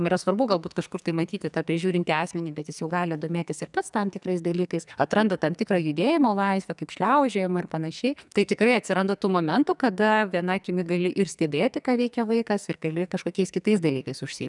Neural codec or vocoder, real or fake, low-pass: codec, 44.1 kHz, 2.6 kbps, SNAC; fake; 10.8 kHz